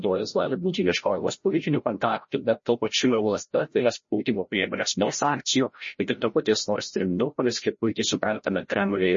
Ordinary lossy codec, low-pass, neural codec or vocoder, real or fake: MP3, 32 kbps; 7.2 kHz; codec, 16 kHz, 0.5 kbps, FreqCodec, larger model; fake